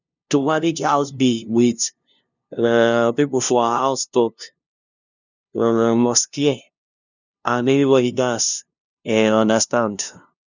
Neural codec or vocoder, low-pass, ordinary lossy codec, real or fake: codec, 16 kHz, 0.5 kbps, FunCodec, trained on LibriTTS, 25 frames a second; 7.2 kHz; none; fake